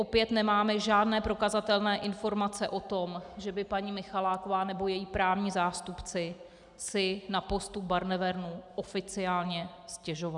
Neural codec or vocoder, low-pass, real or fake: none; 10.8 kHz; real